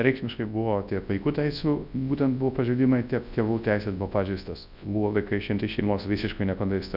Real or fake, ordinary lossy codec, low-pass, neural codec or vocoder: fake; Opus, 64 kbps; 5.4 kHz; codec, 24 kHz, 0.9 kbps, WavTokenizer, large speech release